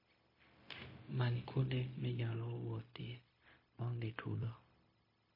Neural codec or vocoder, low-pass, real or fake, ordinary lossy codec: codec, 16 kHz, 0.4 kbps, LongCat-Audio-Codec; 5.4 kHz; fake; MP3, 24 kbps